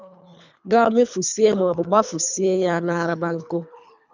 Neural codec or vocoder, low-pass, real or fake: codec, 24 kHz, 3 kbps, HILCodec; 7.2 kHz; fake